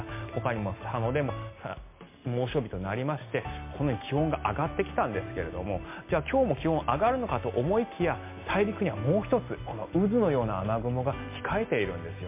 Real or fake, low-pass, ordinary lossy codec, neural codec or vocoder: real; 3.6 kHz; MP3, 24 kbps; none